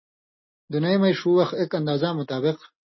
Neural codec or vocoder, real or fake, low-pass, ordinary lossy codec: none; real; 7.2 kHz; MP3, 24 kbps